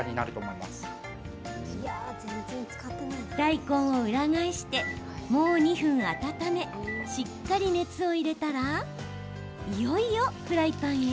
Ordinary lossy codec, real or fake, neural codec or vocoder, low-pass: none; real; none; none